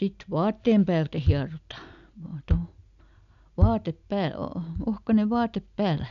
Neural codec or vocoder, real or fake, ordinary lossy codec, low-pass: none; real; none; 7.2 kHz